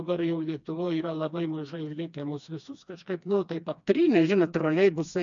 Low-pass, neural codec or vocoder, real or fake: 7.2 kHz; codec, 16 kHz, 2 kbps, FreqCodec, smaller model; fake